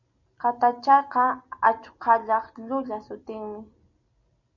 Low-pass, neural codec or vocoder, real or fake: 7.2 kHz; none; real